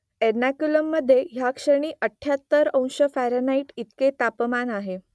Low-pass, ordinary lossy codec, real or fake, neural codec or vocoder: none; none; real; none